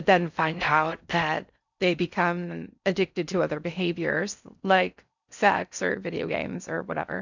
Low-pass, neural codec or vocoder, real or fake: 7.2 kHz; codec, 16 kHz in and 24 kHz out, 0.6 kbps, FocalCodec, streaming, 4096 codes; fake